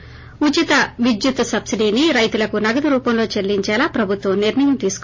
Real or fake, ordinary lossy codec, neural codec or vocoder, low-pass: real; MP3, 32 kbps; none; 7.2 kHz